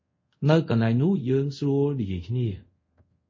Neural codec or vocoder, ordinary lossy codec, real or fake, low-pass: codec, 24 kHz, 0.5 kbps, DualCodec; MP3, 32 kbps; fake; 7.2 kHz